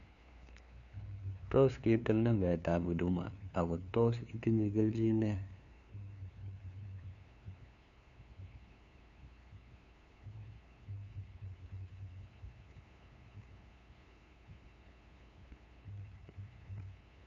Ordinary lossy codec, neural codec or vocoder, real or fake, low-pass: none; codec, 16 kHz, 2 kbps, FunCodec, trained on LibriTTS, 25 frames a second; fake; 7.2 kHz